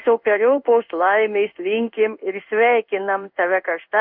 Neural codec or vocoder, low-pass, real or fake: codec, 16 kHz in and 24 kHz out, 1 kbps, XY-Tokenizer; 5.4 kHz; fake